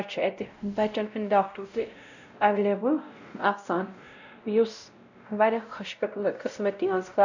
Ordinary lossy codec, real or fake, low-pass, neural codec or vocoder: none; fake; 7.2 kHz; codec, 16 kHz, 0.5 kbps, X-Codec, WavLM features, trained on Multilingual LibriSpeech